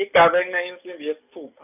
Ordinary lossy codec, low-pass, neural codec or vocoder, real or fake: none; 3.6 kHz; none; real